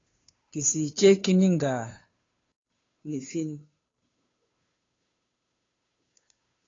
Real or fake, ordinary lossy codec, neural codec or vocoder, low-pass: fake; AAC, 32 kbps; codec, 16 kHz, 2 kbps, FunCodec, trained on Chinese and English, 25 frames a second; 7.2 kHz